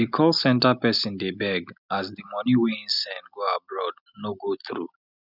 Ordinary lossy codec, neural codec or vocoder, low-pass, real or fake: none; none; 5.4 kHz; real